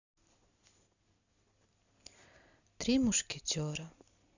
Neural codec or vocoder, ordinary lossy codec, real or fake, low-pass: none; none; real; 7.2 kHz